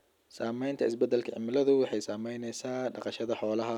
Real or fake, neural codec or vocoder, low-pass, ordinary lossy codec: real; none; 19.8 kHz; none